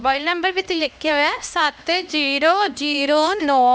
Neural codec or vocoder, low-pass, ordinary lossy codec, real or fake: codec, 16 kHz, 1 kbps, X-Codec, HuBERT features, trained on LibriSpeech; none; none; fake